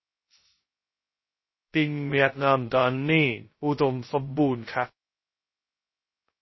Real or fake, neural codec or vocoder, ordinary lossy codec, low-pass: fake; codec, 16 kHz, 0.2 kbps, FocalCodec; MP3, 24 kbps; 7.2 kHz